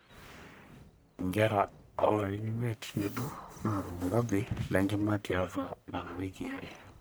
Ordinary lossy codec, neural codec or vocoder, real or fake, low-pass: none; codec, 44.1 kHz, 1.7 kbps, Pupu-Codec; fake; none